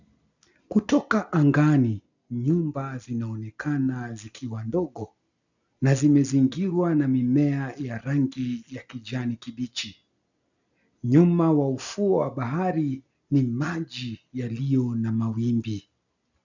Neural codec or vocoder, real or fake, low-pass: none; real; 7.2 kHz